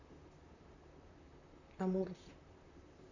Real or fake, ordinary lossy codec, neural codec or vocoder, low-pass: fake; none; vocoder, 44.1 kHz, 128 mel bands, Pupu-Vocoder; 7.2 kHz